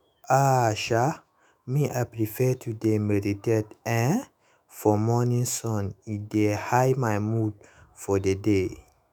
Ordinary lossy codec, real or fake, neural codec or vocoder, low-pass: none; fake; autoencoder, 48 kHz, 128 numbers a frame, DAC-VAE, trained on Japanese speech; none